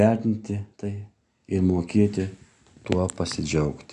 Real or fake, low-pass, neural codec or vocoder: real; 10.8 kHz; none